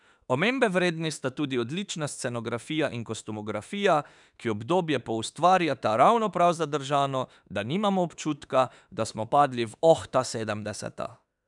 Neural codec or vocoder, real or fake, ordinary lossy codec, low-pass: autoencoder, 48 kHz, 32 numbers a frame, DAC-VAE, trained on Japanese speech; fake; none; 10.8 kHz